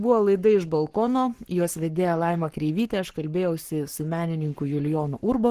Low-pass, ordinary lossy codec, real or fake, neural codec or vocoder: 14.4 kHz; Opus, 16 kbps; fake; codec, 44.1 kHz, 7.8 kbps, Pupu-Codec